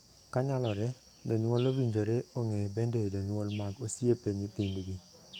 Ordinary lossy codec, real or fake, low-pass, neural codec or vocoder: none; fake; 19.8 kHz; codec, 44.1 kHz, 7.8 kbps, DAC